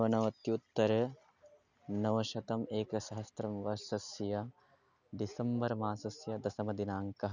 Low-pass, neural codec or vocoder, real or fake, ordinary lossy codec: 7.2 kHz; none; real; none